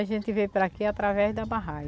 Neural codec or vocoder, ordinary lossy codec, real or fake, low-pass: none; none; real; none